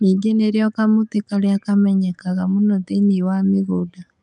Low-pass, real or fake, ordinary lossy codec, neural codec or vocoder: 10.8 kHz; fake; none; codec, 44.1 kHz, 7.8 kbps, DAC